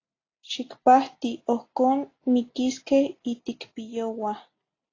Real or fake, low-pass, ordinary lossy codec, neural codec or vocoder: real; 7.2 kHz; AAC, 32 kbps; none